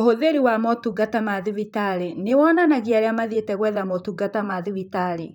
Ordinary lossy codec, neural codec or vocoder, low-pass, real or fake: none; vocoder, 44.1 kHz, 128 mel bands, Pupu-Vocoder; 19.8 kHz; fake